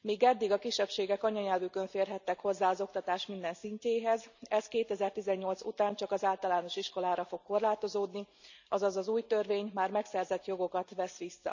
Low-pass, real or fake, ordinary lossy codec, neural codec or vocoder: 7.2 kHz; real; none; none